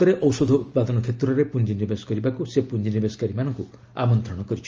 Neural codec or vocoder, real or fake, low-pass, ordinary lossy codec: none; real; 7.2 kHz; Opus, 24 kbps